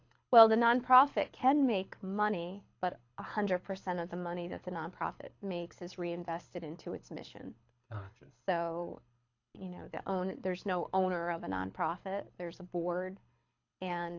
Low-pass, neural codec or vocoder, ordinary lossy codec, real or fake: 7.2 kHz; codec, 24 kHz, 6 kbps, HILCodec; AAC, 48 kbps; fake